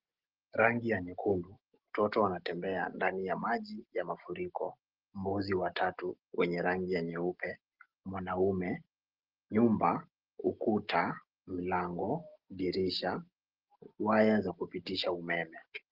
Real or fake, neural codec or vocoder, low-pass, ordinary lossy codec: real; none; 5.4 kHz; Opus, 16 kbps